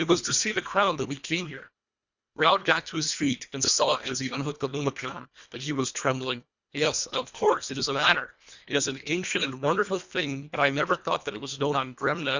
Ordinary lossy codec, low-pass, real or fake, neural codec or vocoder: Opus, 64 kbps; 7.2 kHz; fake; codec, 24 kHz, 1.5 kbps, HILCodec